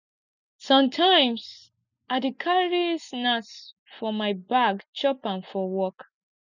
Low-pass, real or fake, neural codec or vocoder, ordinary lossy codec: 7.2 kHz; real; none; none